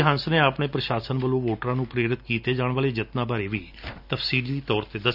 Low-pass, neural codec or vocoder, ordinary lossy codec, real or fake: 5.4 kHz; none; none; real